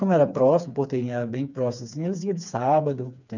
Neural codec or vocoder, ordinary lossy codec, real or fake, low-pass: codec, 16 kHz, 4 kbps, FreqCodec, smaller model; none; fake; 7.2 kHz